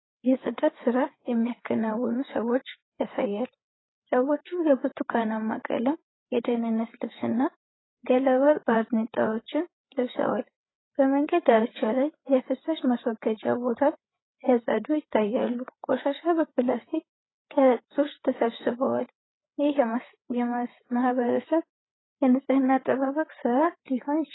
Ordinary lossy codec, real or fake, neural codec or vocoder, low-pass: AAC, 16 kbps; fake; codec, 16 kHz, 8 kbps, FreqCodec, larger model; 7.2 kHz